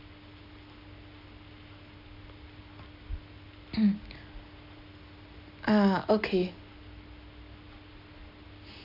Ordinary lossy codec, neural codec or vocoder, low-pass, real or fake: none; none; 5.4 kHz; real